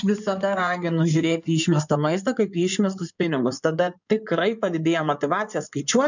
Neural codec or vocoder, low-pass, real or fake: codec, 16 kHz in and 24 kHz out, 2.2 kbps, FireRedTTS-2 codec; 7.2 kHz; fake